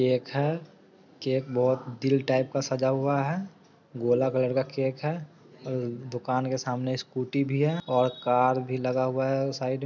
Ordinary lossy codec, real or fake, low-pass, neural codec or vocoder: none; real; 7.2 kHz; none